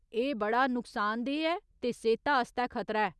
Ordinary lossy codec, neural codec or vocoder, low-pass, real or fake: none; none; none; real